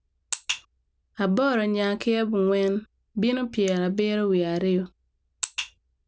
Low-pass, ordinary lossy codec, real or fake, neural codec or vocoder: none; none; real; none